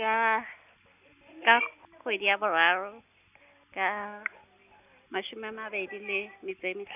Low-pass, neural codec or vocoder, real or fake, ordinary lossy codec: 3.6 kHz; none; real; none